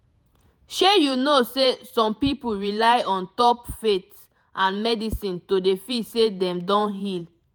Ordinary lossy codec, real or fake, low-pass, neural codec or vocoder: none; fake; none; vocoder, 48 kHz, 128 mel bands, Vocos